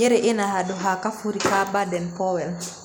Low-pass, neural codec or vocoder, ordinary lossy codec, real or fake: none; none; none; real